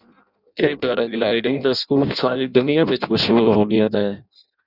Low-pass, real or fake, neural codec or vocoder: 5.4 kHz; fake; codec, 16 kHz in and 24 kHz out, 0.6 kbps, FireRedTTS-2 codec